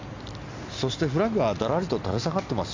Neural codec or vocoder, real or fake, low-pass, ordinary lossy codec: none; real; 7.2 kHz; MP3, 64 kbps